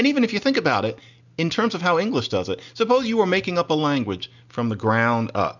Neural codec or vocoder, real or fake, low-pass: none; real; 7.2 kHz